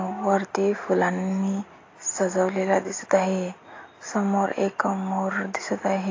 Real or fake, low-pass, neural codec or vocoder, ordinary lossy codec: real; 7.2 kHz; none; AAC, 32 kbps